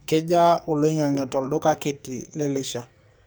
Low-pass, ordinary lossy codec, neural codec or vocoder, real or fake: none; none; codec, 44.1 kHz, 2.6 kbps, SNAC; fake